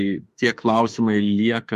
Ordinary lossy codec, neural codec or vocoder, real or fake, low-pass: MP3, 64 kbps; autoencoder, 48 kHz, 32 numbers a frame, DAC-VAE, trained on Japanese speech; fake; 14.4 kHz